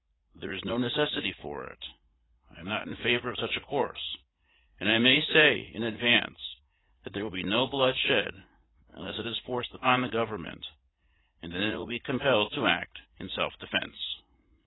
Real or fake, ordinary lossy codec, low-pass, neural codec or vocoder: fake; AAC, 16 kbps; 7.2 kHz; vocoder, 44.1 kHz, 80 mel bands, Vocos